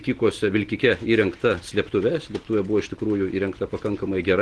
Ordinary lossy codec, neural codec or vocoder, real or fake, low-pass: Opus, 16 kbps; none; real; 10.8 kHz